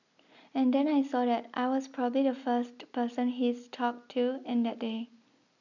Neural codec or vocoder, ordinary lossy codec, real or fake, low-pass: none; none; real; 7.2 kHz